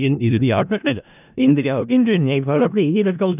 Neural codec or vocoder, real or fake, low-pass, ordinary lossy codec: codec, 16 kHz in and 24 kHz out, 0.4 kbps, LongCat-Audio-Codec, four codebook decoder; fake; 3.6 kHz; none